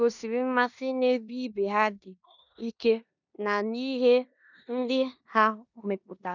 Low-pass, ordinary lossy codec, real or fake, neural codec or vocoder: 7.2 kHz; none; fake; codec, 16 kHz in and 24 kHz out, 0.9 kbps, LongCat-Audio-Codec, four codebook decoder